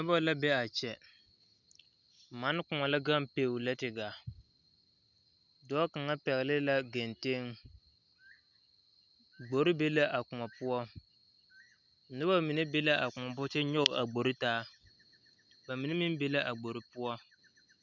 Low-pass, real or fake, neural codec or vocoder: 7.2 kHz; real; none